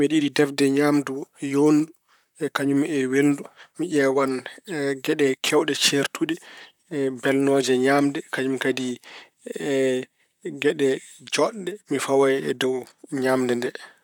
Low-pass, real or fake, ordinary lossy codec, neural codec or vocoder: 19.8 kHz; fake; none; vocoder, 44.1 kHz, 128 mel bands, Pupu-Vocoder